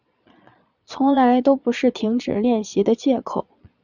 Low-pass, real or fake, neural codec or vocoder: 7.2 kHz; fake; vocoder, 22.05 kHz, 80 mel bands, Vocos